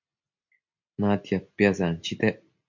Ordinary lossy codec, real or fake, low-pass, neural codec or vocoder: MP3, 48 kbps; real; 7.2 kHz; none